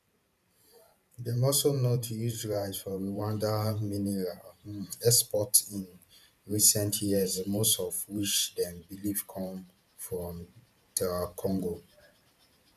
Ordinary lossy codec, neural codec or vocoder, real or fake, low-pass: none; vocoder, 44.1 kHz, 128 mel bands every 512 samples, BigVGAN v2; fake; 14.4 kHz